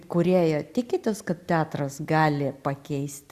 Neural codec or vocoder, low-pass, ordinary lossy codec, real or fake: none; 14.4 kHz; Opus, 64 kbps; real